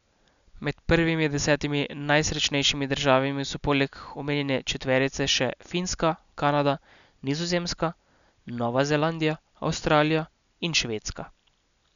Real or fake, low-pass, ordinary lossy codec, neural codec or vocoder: real; 7.2 kHz; none; none